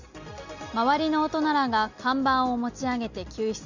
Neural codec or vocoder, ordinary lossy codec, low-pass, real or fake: none; Opus, 64 kbps; 7.2 kHz; real